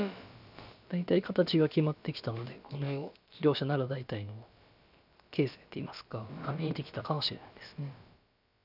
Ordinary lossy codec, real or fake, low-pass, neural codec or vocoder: none; fake; 5.4 kHz; codec, 16 kHz, about 1 kbps, DyCAST, with the encoder's durations